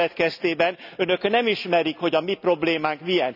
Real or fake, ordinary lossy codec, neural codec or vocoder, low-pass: real; none; none; 5.4 kHz